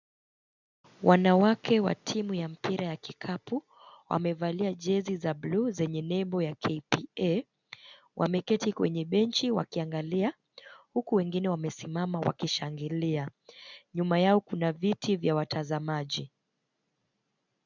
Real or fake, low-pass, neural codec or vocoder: real; 7.2 kHz; none